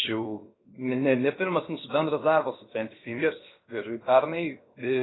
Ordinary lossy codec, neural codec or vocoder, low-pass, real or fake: AAC, 16 kbps; codec, 16 kHz, 0.7 kbps, FocalCodec; 7.2 kHz; fake